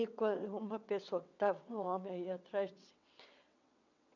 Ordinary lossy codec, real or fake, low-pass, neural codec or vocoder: none; fake; 7.2 kHz; vocoder, 22.05 kHz, 80 mel bands, WaveNeXt